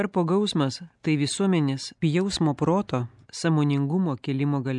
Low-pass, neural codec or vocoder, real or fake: 10.8 kHz; none; real